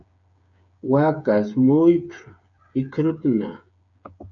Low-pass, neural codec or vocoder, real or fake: 7.2 kHz; codec, 16 kHz, 8 kbps, FreqCodec, smaller model; fake